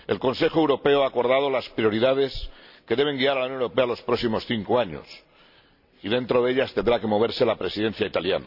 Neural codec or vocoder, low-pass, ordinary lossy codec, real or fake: none; 5.4 kHz; none; real